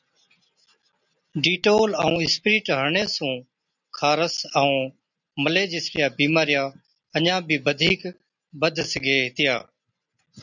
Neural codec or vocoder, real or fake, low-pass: none; real; 7.2 kHz